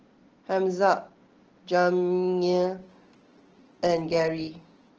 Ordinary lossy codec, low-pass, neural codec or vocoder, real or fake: Opus, 16 kbps; 7.2 kHz; none; real